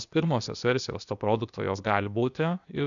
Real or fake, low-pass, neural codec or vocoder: fake; 7.2 kHz; codec, 16 kHz, 0.8 kbps, ZipCodec